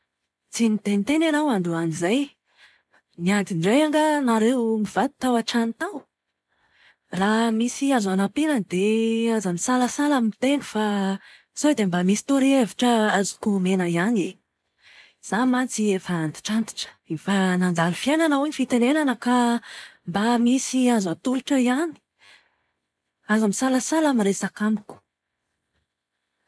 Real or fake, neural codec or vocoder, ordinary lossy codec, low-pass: real; none; none; none